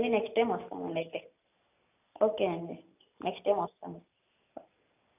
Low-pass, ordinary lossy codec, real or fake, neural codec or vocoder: 3.6 kHz; none; real; none